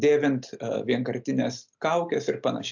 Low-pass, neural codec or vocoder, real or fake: 7.2 kHz; none; real